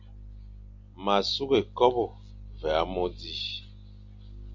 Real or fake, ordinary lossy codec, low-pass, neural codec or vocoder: real; MP3, 48 kbps; 7.2 kHz; none